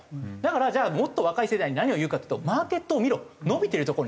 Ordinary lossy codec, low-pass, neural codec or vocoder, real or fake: none; none; none; real